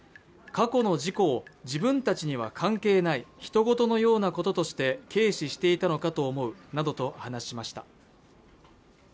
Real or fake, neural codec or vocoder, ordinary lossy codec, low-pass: real; none; none; none